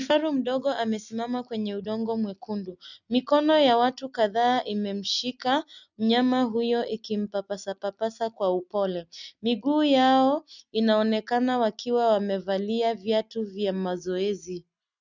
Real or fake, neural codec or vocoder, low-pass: real; none; 7.2 kHz